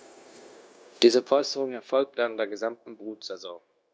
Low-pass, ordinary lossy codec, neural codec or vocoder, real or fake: none; none; codec, 16 kHz, 0.9 kbps, LongCat-Audio-Codec; fake